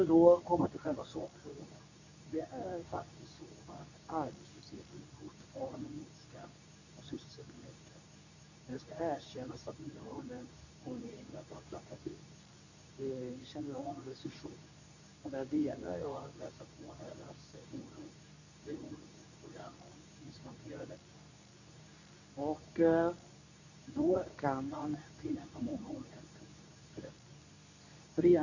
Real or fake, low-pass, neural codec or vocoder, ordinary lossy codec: fake; 7.2 kHz; codec, 24 kHz, 0.9 kbps, WavTokenizer, medium speech release version 2; none